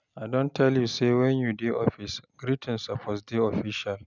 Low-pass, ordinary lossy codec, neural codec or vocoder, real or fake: 7.2 kHz; none; none; real